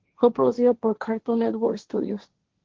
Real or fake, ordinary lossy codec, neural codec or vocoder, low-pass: fake; Opus, 16 kbps; codec, 16 kHz, 1.1 kbps, Voila-Tokenizer; 7.2 kHz